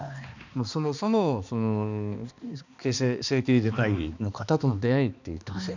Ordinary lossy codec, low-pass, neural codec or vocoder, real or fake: none; 7.2 kHz; codec, 16 kHz, 2 kbps, X-Codec, HuBERT features, trained on balanced general audio; fake